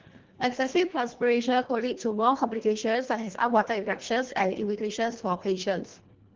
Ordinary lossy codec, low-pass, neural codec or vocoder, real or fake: Opus, 16 kbps; 7.2 kHz; codec, 24 kHz, 1.5 kbps, HILCodec; fake